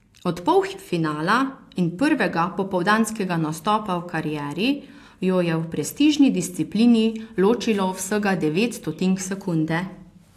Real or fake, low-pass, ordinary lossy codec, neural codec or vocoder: real; 14.4 kHz; AAC, 64 kbps; none